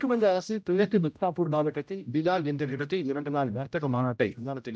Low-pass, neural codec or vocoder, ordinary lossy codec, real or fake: none; codec, 16 kHz, 0.5 kbps, X-Codec, HuBERT features, trained on general audio; none; fake